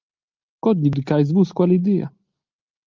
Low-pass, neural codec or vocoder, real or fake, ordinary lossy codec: 7.2 kHz; none; real; Opus, 24 kbps